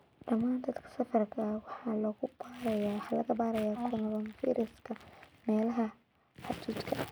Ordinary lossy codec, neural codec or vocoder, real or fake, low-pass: none; none; real; none